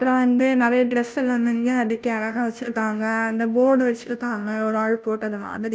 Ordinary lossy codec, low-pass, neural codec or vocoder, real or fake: none; none; codec, 16 kHz, 0.5 kbps, FunCodec, trained on Chinese and English, 25 frames a second; fake